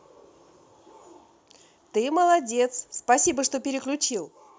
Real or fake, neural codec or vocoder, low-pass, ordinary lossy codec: real; none; none; none